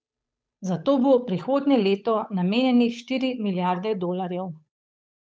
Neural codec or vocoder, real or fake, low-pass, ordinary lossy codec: codec, 16 kHz, 8 kbps, FunCodec, trained on Chinese and English, 25 frames a second; fake; none; none